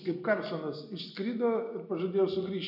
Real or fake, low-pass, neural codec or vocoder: real; 5.4 kHz; none